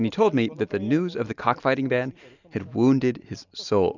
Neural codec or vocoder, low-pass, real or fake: none; 7.2 kHz; real